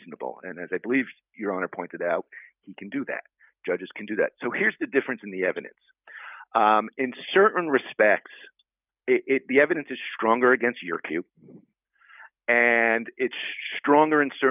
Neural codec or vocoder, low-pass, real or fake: none; 3.6 kHz; real